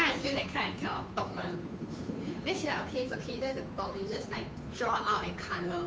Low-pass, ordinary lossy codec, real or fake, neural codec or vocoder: none; none; fake; codec, 16 kHz, 2 kbps, FunCodec, trained on Chinese and English, 25 frames a second